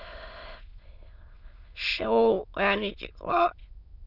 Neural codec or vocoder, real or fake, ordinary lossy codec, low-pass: autoencoder, 22.05 kHz, a latent of 192 numbers a frame, VITS, trained on many speakers; fake; none; 5.4 kHz